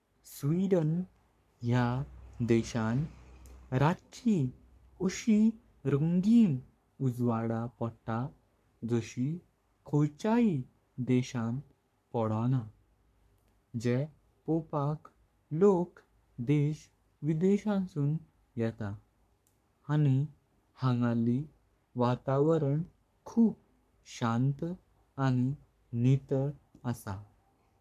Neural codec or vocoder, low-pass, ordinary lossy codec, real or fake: codec, 44.1 kHz, 3.4 kbps, Pupu-Codec; 14.4 kHz; none; fake